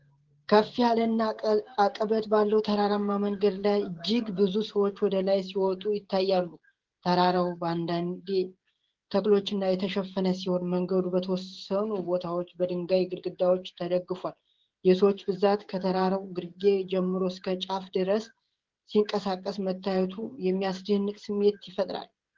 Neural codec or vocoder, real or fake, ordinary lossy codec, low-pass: codec, 16 kHz, 16 kbps, FreqCodec, smaller model; fake; Opus, 16 kbps; 7.2 kHz